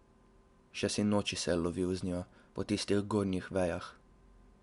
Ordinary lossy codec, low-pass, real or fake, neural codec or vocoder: Opus, 64 kbps; 10.8 kHz; real; none